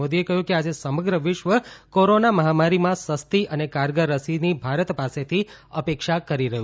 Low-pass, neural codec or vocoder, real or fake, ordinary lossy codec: none; none; real; none